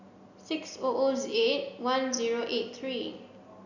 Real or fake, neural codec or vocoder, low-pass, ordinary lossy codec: real; none; 7.2 kHz; none